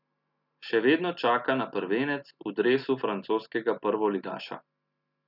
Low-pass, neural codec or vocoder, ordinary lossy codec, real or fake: 5.4 kHz; none; none; real